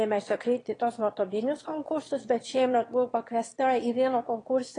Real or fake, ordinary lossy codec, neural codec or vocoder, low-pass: fake; AAC, 32 kbps; autoencoder, 22.05 kHz, a latent of 192 numbers a frame, VITS, trained on one speaker; 9.9 kHz